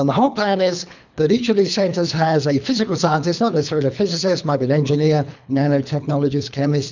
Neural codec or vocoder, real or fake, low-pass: codec, 24 kHz, 3 kbps, HILCodec; fake; 7.2 kHz